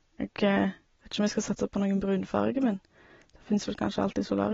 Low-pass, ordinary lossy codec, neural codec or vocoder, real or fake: 7.2 kHz; AAC, 24 kbps; none; real